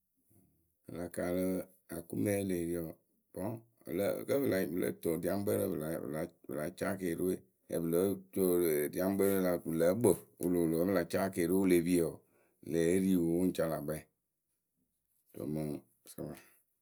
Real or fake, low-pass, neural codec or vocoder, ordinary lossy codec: real; none; none; none